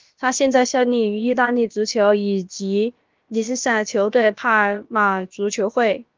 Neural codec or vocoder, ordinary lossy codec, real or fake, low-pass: codec, 16 kHz, about 1 kbps, DyCAST, with the encoder's durations; Opus, 32 kbps; fake; 7.2 kHz